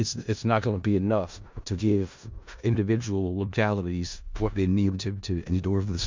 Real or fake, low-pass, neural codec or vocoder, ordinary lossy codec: fake; 7.2 kHz; codec, 16 kHz in and 24 kHz out, 0.4 kbps, LongCat-Audio-Codec, four codebook decoder; MP3, 64 kbps